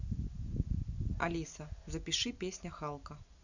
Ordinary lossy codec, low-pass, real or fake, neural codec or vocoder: none; 7.2 kHz; real; none